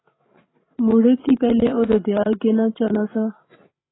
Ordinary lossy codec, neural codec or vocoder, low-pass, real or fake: AAC, 16 kbps; codec, 16 kHz, 16 kbps, FreqCodec, larger model; 7.2 kHz; fake